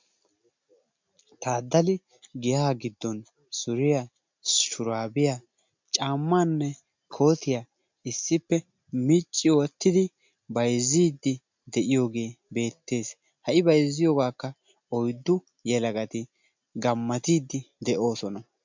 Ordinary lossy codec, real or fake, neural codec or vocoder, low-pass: MP3, 64 kbps; real; none; 7.2 kHz